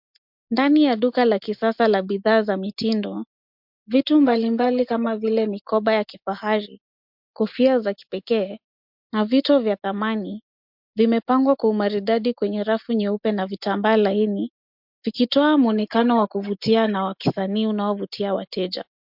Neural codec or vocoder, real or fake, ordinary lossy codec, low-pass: none; real; MP3, 48 kbps; 5.4 kHz